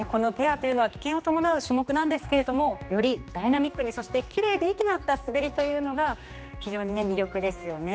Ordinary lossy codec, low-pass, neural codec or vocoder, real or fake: none; none; codec, 16 kHz, 2 kbps, X-Codec, HuBERT features, trained on general audio; fake